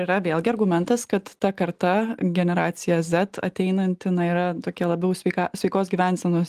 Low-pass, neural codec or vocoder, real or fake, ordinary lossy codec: 14.4 kHz; none; real; Opus, 24 kbps